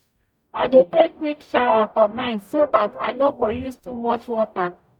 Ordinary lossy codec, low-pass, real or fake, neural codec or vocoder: none; 19.8 kHz; fake; codec, 44.1 kHz, 0.9 kbps, DAC